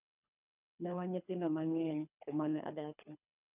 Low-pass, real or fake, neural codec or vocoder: 3.6 kHz; fake; codec, 24 kHz, 3 kbps, HILCodec